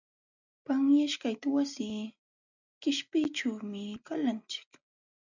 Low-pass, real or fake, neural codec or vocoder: 7.2 kHz; real; none